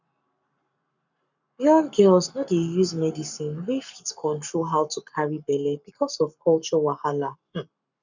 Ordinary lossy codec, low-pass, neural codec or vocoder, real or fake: none; 7.2 kHz; codec, 44.1 kHz, 7.8 kbps, Pupu-Codec; fake